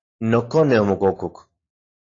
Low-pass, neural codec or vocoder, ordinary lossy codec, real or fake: 7.2 kHz; none; AAC, 32 kbps; real